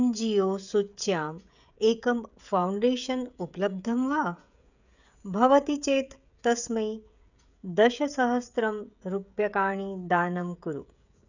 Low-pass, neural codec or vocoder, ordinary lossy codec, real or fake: 7.2 kHz; codec, 16 kHz, 16 kbps, FreqCodec, smaller model; none; fake